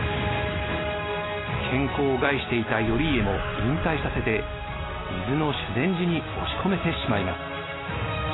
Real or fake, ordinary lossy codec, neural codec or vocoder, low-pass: real; AAC, 16 kbps; none; 7.2 kHz